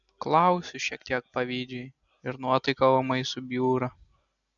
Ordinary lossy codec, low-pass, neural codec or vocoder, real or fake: MP3, 96 kbps; 7.2 kHz; none; real